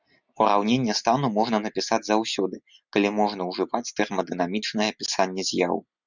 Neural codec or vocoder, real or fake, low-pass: none; real; 7.2 kHz